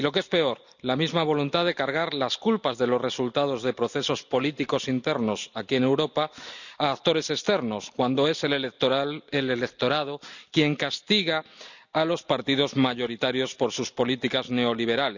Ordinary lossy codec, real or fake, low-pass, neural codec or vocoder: none; real; 7.2 kHz; none